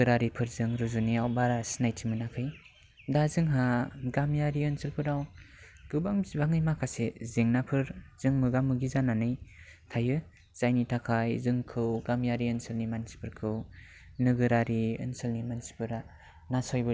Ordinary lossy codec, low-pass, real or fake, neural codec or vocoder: none; none; real; none